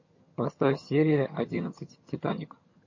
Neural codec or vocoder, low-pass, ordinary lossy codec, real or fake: vocoder, 22.05 kHz, 80 mel bands, HiFi-GAN; 7.2 kHz; MP3, 32 kbps; fake